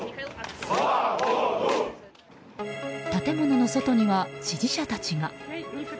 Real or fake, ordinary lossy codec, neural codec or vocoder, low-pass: real; none; none; none